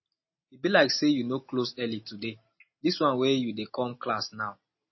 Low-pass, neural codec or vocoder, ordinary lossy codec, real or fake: 7.2 kHz; none; MP3, 24 kbps; real